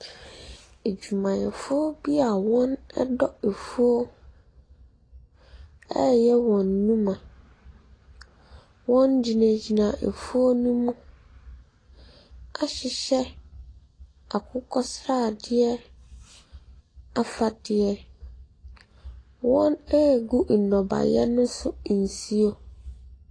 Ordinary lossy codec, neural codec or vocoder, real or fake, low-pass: AAC, 32 kbps; none; real; 9.9 kHz